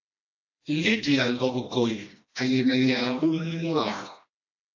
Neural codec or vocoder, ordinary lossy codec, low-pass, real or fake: codec, 16 kHz, 1 kbps, FreqCodec, smaller model; AAC, 48 kbps; 7.2 kHz; fake